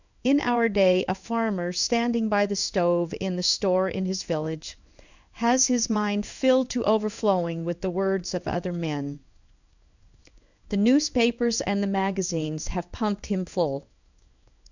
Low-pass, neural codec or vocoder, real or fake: 7.2 kHz; codec, 16 kHz in and 24 kHz out, 1 kbps, XY-Tokenizer; fake